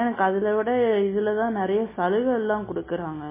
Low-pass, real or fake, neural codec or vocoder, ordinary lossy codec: 3.6 kHz; real; none; MP3, 16 kbps